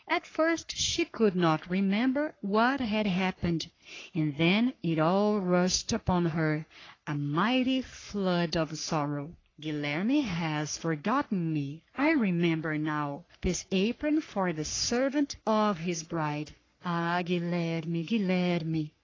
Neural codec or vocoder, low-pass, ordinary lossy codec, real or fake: codec, 44.1 kHz, 3.4 kbps, Pupu-Codec; 7.2 kHz; AAC, 32 kbps; fake